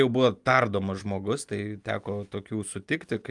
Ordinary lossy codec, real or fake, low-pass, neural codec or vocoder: Opus, 32 kbps; real; 10.8 kHz; none